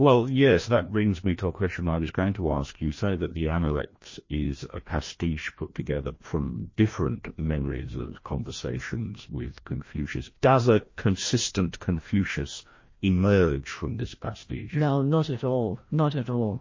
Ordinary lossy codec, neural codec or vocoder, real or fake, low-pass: MP3, 32 kbps; codec, 16 kHz, 1 kbps, FreqCodec, larger model; fake; 7.2 kHz